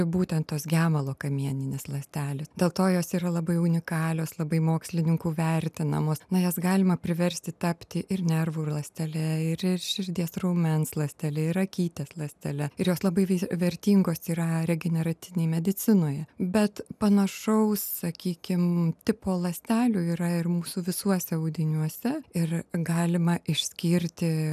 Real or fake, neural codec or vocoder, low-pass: real; none; 14.4 kHz